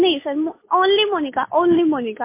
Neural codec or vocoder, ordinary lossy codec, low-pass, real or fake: none; MP3, 24 kbps; 3.6 kHz; real